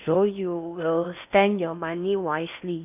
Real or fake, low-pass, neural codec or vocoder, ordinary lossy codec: fake; 3.6 kHz; codec, 16 kHz in and 24 kHz out, 0.8 kbps, FocalCodec, streaming, 65536 codes; none